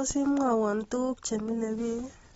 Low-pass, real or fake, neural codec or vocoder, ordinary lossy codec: 19.8 kHz; real; none; AAC, 24 kbps